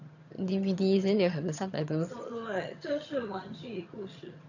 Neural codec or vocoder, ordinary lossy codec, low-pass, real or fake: vocoder, 22.05 kHz, 80 mel bands, HiFi-GAN; none; 7.2 kHz; fake